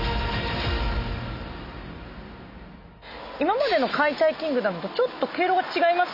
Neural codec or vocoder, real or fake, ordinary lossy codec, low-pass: none; real; none; 5.4 kHz